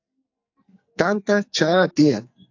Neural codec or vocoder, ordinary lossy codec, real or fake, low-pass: codec, 44.1 kHz, 2.6 kbps, SNAC; AAC, 48 kbps; fake; 7.2 kHz